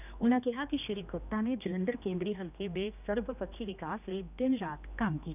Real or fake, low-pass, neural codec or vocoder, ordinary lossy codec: fake; 3.6 kHz; codec, 16 kHz, 2 kbps, X-Codec, HuBERT features, trained on general audio; none